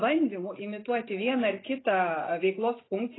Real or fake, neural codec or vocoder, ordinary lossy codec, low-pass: real; none; AAC, 16 kbps; 7.2 kHz